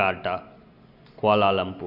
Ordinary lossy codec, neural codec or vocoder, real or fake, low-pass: none; none; real; 5.4 kHz